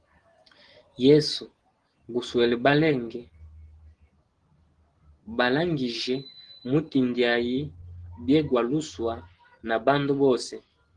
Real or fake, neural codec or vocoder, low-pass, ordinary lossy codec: real; none; 9.9 kHz; Opus, 16 kbps